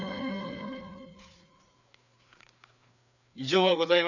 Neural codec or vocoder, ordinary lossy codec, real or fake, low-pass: codec, 16 kHz, 4 kbps, FreqCodec, larger model; none; fake; 7.2 kHz